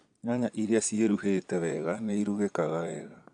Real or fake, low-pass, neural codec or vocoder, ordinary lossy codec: fake; 9.9 kHz; vocoder, 22.05 kHz, 80 mel bands, Vocos; none